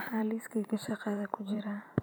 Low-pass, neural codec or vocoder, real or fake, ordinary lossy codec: none; vocoder, 44.1 kHz, 128 mel bands every 512 samples, BigVGAN v2; fake; none